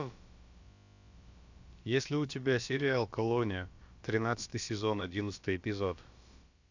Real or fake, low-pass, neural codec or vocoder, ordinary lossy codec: fake; 7.2 kHz; codec, 16 kHz, about 1 kbps, DyCAST, with the encoder's durations; Opus, 64 kbps